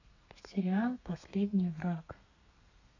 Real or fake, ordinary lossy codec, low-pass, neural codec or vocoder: fake; none; 7.2 kHz; codec, 32 kHz, 1.9 kbps, SNAC